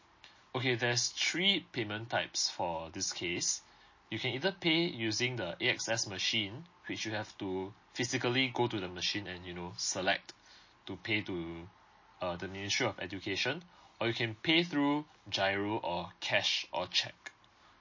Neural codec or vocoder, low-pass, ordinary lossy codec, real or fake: none; 7.2 kHz; MP3, 32 kbps; real